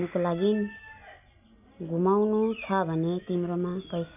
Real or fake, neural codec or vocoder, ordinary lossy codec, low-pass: real; none; none; 3.6 kHz